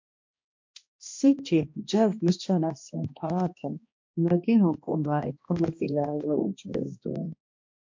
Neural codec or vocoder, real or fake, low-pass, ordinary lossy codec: codec, 16 kHz, 1 kbps, X-Codec, HuBERT features, trained on balanced general audio; fake; 7.2 kHz; MP3, 48 kbps